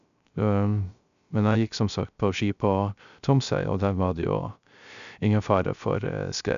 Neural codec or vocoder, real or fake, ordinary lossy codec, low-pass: codec, 16 kHz, 0.3 kbps, FocalCodec; fake; none; 7.2 kHz